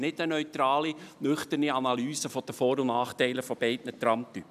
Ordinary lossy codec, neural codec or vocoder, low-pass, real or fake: none; none; 14.4 kHz; real